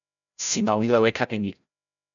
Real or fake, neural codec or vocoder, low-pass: fake; codec, 16 kHz, 0.5 kbps, FreqCodec, larger model; 7.2 kHz